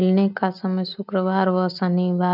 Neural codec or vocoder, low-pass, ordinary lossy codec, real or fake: none; 5.4 kHz; none; real